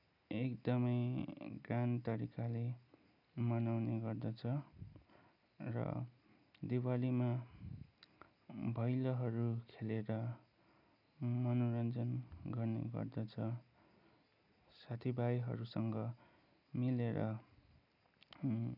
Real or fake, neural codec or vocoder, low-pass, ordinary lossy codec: real; none; 5.4 kHz; none